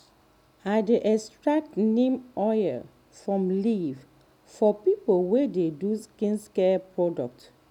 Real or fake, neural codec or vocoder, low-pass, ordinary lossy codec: real; none; 19.8 kHz; none